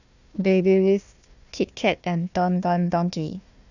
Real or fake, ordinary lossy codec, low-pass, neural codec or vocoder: fake; none; 7.2 kHz; codec, 16 kHz, 1 kbps, FunCodec, trained on Chinese and English, 50 frames a second